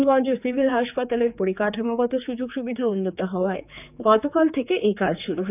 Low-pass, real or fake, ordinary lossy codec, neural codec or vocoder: 3.6 kHz; fake; none; codec, 16 kHz, 4 kbps, X-Codec, HuBERT features, trained on general audio